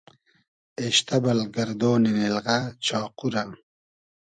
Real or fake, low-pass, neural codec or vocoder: fake; 9.9 kHz; vocoder, 44.1 kHz, 128 mel bands every 256 samples, BigVGAN v2